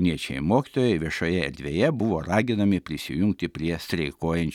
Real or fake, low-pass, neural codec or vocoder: real; 19.8 kHz; none